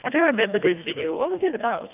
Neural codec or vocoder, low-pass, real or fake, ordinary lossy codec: codec, 24 kHz, 1.5 kbps, HILCodec; 3.6 kHz; fake; none